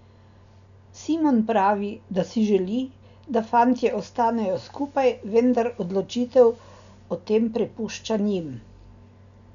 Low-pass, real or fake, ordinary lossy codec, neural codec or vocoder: 7.2 kHz; real; none; none